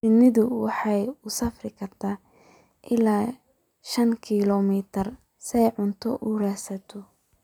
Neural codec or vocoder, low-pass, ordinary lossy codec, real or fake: vocoder, 44.1 kHz, 128 mel bands every 256 samples, BigVGAN v2; 19.8 kHz; none; fake